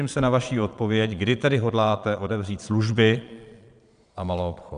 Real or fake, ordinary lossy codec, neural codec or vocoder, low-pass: fake; MP3, 96 kbps; vocoder, 22.05 kHz, 80 mel bands, Vocos; 9.9 kHz